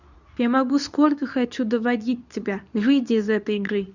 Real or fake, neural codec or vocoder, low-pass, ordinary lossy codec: fake; codec, 24 kHz, 0.9 kbps, WavTokenizer, medium speech release version 2; 7.2 kHz; none